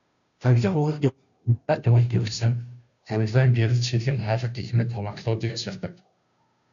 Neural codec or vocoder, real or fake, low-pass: codec, 16 kHz, 0.5 kbps, FunCodec, trained on Chinese and English, 25 frames a second; fake; 7.2 kHz